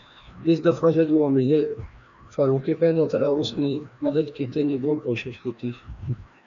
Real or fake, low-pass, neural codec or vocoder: fake; 7.2 kHz; codec, 16 kHz, 1 kbps, FreqCodec, larger model